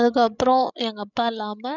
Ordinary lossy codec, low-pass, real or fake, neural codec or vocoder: none; 7.2 kHz; fake; codec, 16 kHz, 16 kbps, FreqCodec, larger model